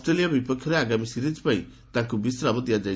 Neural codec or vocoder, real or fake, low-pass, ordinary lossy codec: none; real; none; none